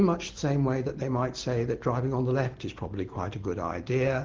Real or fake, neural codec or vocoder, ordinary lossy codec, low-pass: real; none; Opus, 16 kbps; 7.2 kHz